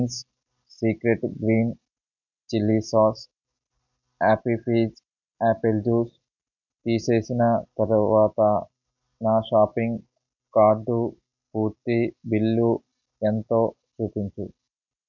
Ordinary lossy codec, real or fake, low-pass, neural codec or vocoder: none; real; 7.2 kHz; none